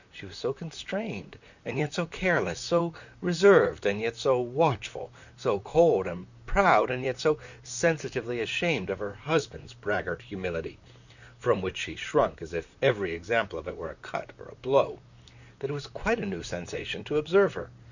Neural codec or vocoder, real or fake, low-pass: vocoder, 44.1 kHz, 128 mel bands, Pupu-Vocoder; fake; 7.2 kHz